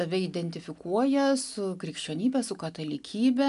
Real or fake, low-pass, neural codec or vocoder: real; 10.8 kHz; none